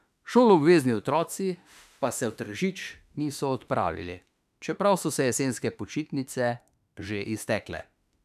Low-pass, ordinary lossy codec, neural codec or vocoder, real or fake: 14.4 kHz; none; autoencoder, 48 kHz, 32 numbers a frame, DAC-VAE, trained on Japanese speech; fake